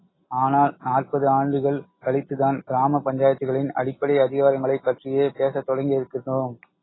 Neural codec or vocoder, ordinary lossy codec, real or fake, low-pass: none; AAC, 16 kbps; real; 7.2 kHz